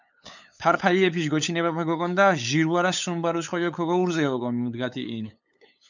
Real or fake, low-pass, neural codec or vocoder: fake; 7.2 kHz; codec, 16 kHz, 8 kbps, FunCodec, trained on LibriTTS, 25 frames a second